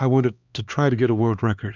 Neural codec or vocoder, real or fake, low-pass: codec, 16 kHz, 2 kbps, X-Codec, HuBERT features, trained on LibriSpeech; fake; 7.2 kHz